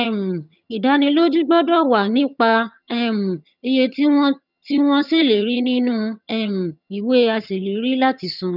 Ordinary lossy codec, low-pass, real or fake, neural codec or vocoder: none; 5.4 kHz; fake; vocoder, 22.05 kHz, 80 mel bands, HiFi-GAN